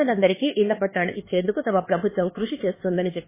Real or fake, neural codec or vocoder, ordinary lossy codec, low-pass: fake; codec, 16 kHz, 4 kbps, X-Codec, HuBERT features, trained on LibriSpeech; MP3, 16 kbps; 3.6 kHz